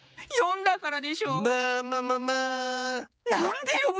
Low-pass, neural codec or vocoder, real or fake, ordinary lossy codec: none; codec, 16 kHz, 4 kbps, X-Codec, HuBERT features, trained on general audio; fake; none